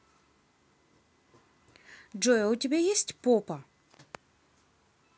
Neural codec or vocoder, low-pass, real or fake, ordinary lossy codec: none; none; real; none